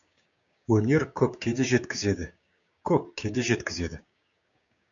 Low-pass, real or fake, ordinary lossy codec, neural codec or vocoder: 7.2 kHz; fake; MP3, 96 kbps; codec, 16 kHz, 6 kbps, DAC